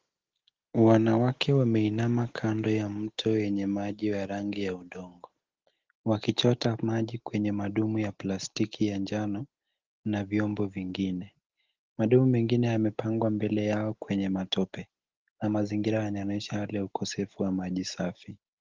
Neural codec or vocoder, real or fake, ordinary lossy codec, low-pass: none; real; Opus, 16 kbps; 7.2 kHz